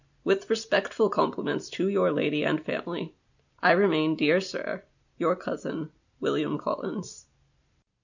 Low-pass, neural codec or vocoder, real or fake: 7.2 kHz; none; real